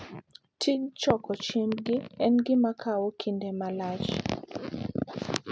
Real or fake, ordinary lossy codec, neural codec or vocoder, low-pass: real; none; none; none